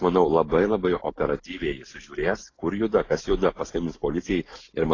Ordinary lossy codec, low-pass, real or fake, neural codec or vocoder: AAC, 32 kbps; 7.2 kHz; real; none